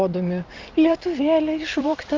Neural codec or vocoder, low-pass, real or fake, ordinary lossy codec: vocoder, 44.1 kHz, 128 mel bands every 512 samples, BigVGAN v2; 7.2 kHz; fake; Opus, 24 kbps